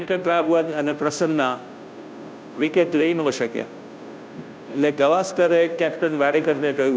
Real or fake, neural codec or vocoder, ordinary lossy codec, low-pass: fake; codec, 16 kHz, 0.5 kbps, FunCodec, trained on Chinese and English, 25 frames a second; none; none